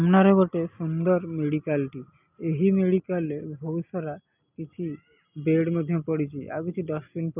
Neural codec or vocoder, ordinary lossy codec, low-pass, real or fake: none; none; 3.6 kHz; real